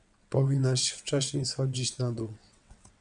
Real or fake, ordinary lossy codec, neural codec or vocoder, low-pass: fake; MP3, 96 kbps; vocoder, 22.05 kHz, 80 mel bands, WaveNeXt; 9.9 kHz